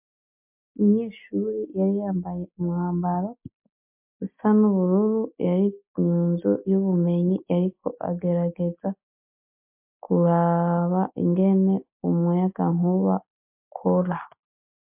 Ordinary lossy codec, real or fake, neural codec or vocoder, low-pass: MP3, 32 kbps; real; none; 3.6 kHz